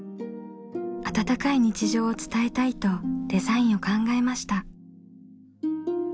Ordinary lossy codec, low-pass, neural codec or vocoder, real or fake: none; none; none; real